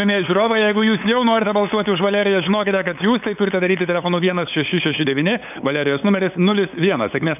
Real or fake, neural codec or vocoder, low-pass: fake; codec, 16 kHz, 8 kbps, FunCodec, trained on LibriTTS, 25 frames a second; 3.6 kHz